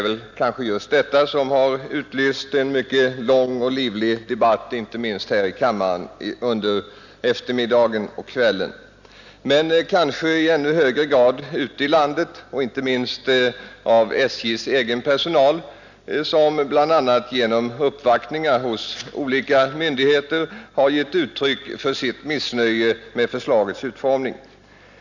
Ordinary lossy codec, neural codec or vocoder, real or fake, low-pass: none; none; real; 7.2 kHz